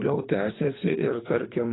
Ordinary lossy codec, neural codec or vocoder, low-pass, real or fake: AAC, 16 kbps; codec, 24 kHz, 3 kbps, HILCodec; 7.2 kHz; fake